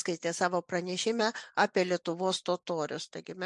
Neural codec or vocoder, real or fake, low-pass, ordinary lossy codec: none; real; 10.8 kHz; MP3, 64 kbps